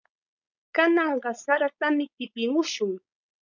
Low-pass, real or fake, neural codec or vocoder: 7.2 kHz; fake; codec, 16 kHz, 4.8 kbps, FACodec